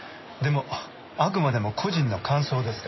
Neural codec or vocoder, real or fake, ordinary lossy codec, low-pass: none; real; MP3, 24 kbps; 7.2 kHz